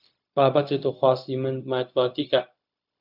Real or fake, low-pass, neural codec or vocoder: fake; 5.4 kHz; codec, 16 kHz, 0.4 kbps, LongCat-Audio-Codec